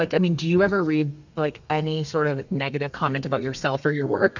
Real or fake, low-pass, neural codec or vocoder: fake; 7.2 kHz; codec, 32 kHz, 1.9 kbps, SNAC